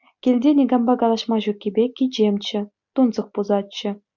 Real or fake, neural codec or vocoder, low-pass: real; none; 7.2 kHz